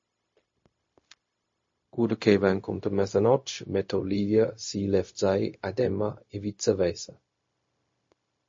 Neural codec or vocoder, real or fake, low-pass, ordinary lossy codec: codec, 16 kHz, 0.4 kbps, LongCat-Audio-Codec; fake; 7.2 kHz; MP3, 32 kbps